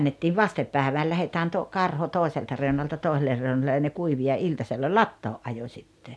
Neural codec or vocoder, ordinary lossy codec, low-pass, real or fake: none; none; none; real